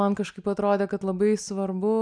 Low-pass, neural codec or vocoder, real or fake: 9.9 kHz; none; real